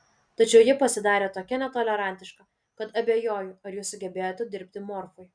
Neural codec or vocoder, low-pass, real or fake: none; 9.9 kHz; real